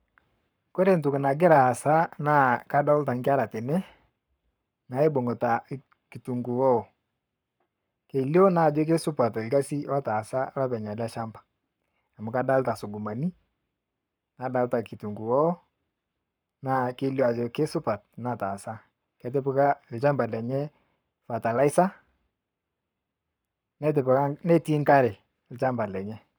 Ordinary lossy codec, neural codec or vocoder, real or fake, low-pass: none; codec, 44.1 kHz, 7.8 kbps, Pupu-Codec; fake; none